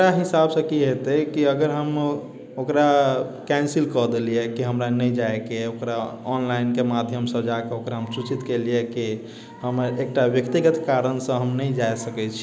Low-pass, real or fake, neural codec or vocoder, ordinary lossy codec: none; real; none; none